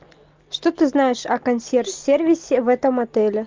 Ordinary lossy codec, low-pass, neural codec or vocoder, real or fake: Opus, 24 kbps; 7.2 kHz; none; real